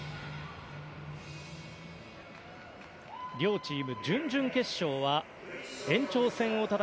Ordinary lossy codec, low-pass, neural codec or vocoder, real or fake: none; none; none; real